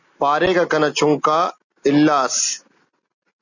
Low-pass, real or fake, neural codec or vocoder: 7.2 kHz; real; none